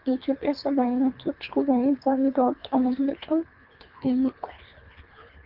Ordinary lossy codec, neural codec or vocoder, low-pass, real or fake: Opus, 32 kbps; codec, 24 kHz, 3 kbps, HILCodec; 5.4 kHz; fake